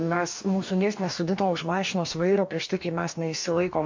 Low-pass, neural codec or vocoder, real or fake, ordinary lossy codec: 7.2 kHz; codec, 44.1 kHz, 2.6 kbps, DAC; fake; MP3, 48 kbps